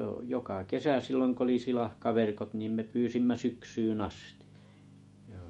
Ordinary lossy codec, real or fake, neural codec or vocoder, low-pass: MP3, 48 kbps; fake; autoencoder, 48 kHz, 128 numbers a frame, DAC-VAE, trained on Japanese speech; 19.8 kHz